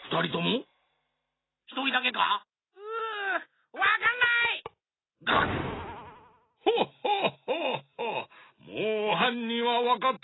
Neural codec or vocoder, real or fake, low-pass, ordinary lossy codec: none; real; 7.2 kHz; AAC, 16 kbps